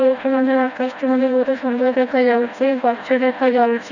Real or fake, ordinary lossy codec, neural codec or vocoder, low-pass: fake; none; codec, 16 kHz, 1 kbps, FreqCodec, smaller model; 7.2 kHz